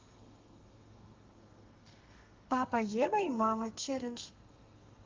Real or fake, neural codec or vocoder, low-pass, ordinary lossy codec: fake; codec, 32 kHz, 1.9 kbps, SNAC; 7.2 kHz; Opus, 32 kbps